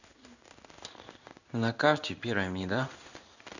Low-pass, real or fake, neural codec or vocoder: 7.2 kHz; fake; codec, 16 kHz in and 24 kHz out, 1 kbps, XY-Tokenizer